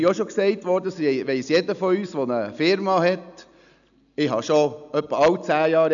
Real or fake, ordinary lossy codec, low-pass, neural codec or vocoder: real; none; 7.2 kHz; none